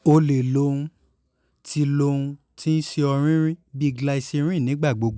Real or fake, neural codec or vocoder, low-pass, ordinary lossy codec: real; none; none; none